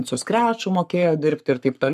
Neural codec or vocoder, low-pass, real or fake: codec, 44.1 kHz, 7.8 kbps, Pupu-Codec; 14.4 kHz; fake